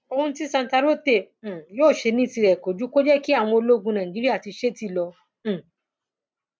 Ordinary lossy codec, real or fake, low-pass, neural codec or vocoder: none; real; none; none